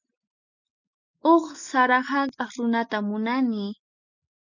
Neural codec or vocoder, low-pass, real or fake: none; 7.2 kHz; real